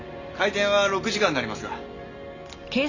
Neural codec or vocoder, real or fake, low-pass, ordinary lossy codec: none; real; 7.2 kHz; none